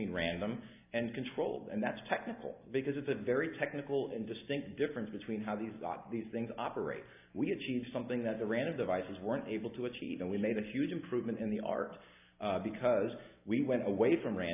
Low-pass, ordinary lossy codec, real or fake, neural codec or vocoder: 3.6 kHz; AAC, 32 kbps; real; none